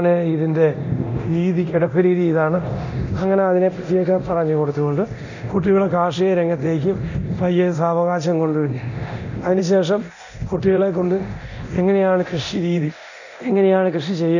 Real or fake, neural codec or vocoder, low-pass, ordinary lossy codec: fake; codec, 24 kHz, 0.9 kbps, DualCodec; 7.2 kHz; none